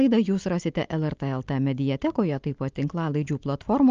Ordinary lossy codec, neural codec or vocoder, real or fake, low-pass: Opus, 24 kbps; none; real; 7.2 kHz